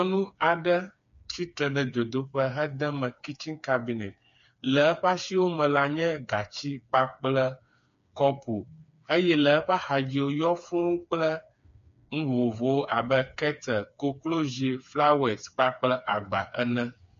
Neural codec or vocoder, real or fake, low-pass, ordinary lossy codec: codec, 16 kHz, 4 kbps, FreqCodec, smaller model; fake; 7.2 kHz; MP3, 48 kbps